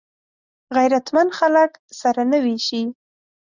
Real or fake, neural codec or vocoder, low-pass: real; none; 7.2 kHz